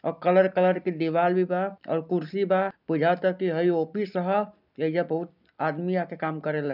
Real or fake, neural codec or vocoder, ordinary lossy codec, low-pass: real; none; none; 5.4 kHz